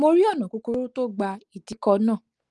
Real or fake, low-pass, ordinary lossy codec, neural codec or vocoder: real; 10.8 kHz; Opus, 24 kbps; none